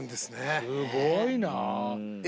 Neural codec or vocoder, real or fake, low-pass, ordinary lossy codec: none; real; none; none